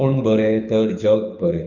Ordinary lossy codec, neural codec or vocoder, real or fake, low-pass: none; codec, 24 kHz, 6 kbps, HILCodec; fake; 7.2 kHz